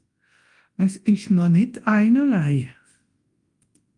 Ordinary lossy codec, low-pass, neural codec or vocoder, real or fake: Opus, 24 kbps; 10.8 kHz; codec, 24 kHz, 0.9 kbps, WavTokenizer, large speech release; fake